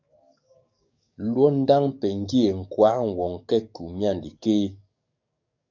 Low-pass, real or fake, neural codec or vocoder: 7.2 kHz; fake; codec, 44.1 kHz, 7.8 kbps, DAC